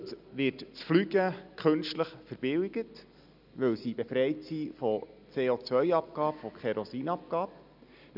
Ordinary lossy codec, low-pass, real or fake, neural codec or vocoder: none; 5.4 kHz; real; none